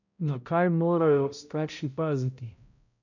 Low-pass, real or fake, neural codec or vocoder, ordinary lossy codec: 7.2 kHz; fake; codec, 16 kHz, 0.5 kbps, X-Codec, HuBERT features, trained on balanced general audio; none